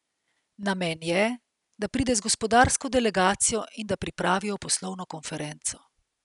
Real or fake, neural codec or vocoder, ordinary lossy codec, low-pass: real; none; none; 10.8 kHz